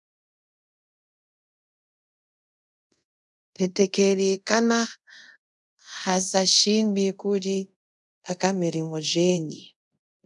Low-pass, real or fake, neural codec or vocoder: 10.8 kHz; fake; codec, 24 kHz, 0.5 kbps, DualCodec